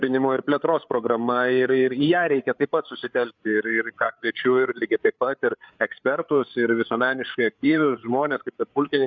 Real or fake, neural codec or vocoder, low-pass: fake; codec, 16 kHz, 8 kbps, FreqCodec, larger model; 7.2 kHz